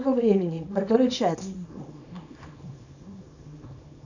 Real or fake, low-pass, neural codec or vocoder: fake; 7.2 kHz; codec, 24 kHz, 0.9 kbps, WavTokenizer, small release